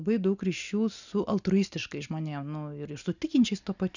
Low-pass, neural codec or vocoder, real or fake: 7.2 kHz; none; real